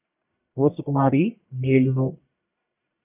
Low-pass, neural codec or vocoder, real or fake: 3.6 kHz; codec, 44.1 kHz, 1.7 kbps, Pupu-Codec; fake